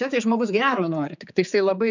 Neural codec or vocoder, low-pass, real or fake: codec, 16 kHz, 4 kbps, X-Codec, HuBERT features, trained on general audio; 7.2 kHz; fake